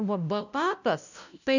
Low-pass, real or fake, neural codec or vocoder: 7.2 kHz; fake; codec, 16 kHz, 0.5 kbps, FunCodec, trained on LibriTTS, 25 frames a second